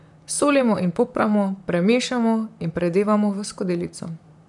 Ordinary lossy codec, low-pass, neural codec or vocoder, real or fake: none; 10.8 kHz; vocoder, 44.1 kHz, 128 mel bands, Pupu-Vocoder; fake